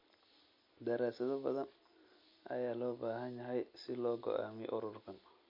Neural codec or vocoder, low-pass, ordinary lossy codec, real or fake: none; 5.4 kHz; MP3, 32 kbps; real